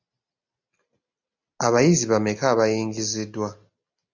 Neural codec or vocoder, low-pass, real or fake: none; 7.2 kHz; real